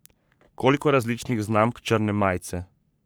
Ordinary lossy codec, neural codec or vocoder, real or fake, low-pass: none; codec, 44.1 kHz, 3.4 kbps, Pupu-Codec; fake; none